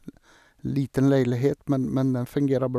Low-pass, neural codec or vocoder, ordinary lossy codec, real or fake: 14.4 kHz; none; none; real